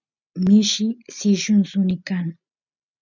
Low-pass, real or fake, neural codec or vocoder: 7.2 kHz; real; none